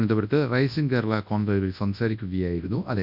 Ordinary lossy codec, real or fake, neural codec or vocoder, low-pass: none; fake; codec, 24 kHz, 0.9 kbps, WavTokenizer, large speech release; 5.4 kHz